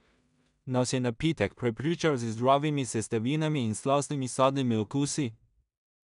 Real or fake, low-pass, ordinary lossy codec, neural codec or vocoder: fake; 10.8 kHz; none; codec, 16 kHz in and 24 kHz out, 0.4 kbps, LongCat-Audio-Codec, two codebook decoder